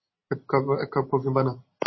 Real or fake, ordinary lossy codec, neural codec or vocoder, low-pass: real; MP3, 24 kbps; none; 7.2 kHz